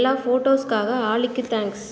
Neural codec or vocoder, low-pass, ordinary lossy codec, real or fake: none; none; none; real